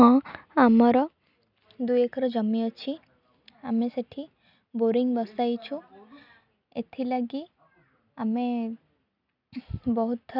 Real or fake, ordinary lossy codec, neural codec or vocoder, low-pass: real; none; none; 5.4 kHz